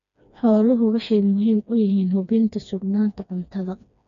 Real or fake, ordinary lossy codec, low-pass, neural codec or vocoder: fake; none; 7.2 kHz; codec, 16 kHz, 2 kbps, FreqCodec, smaller model